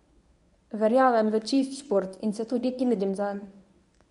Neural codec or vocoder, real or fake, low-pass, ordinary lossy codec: codec, 24 kHz, 0.9 kbps, WavTokenizer, medium speech release version 1; fake; 10.8 kHz; none